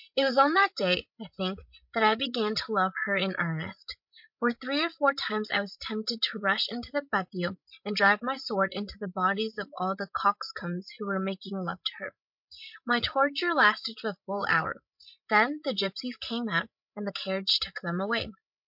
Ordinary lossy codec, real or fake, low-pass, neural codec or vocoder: MP3, 48 kbps; fake; 5.4 kHz; codec, 16 kHz, 16 kbps, FreqCodec, larger model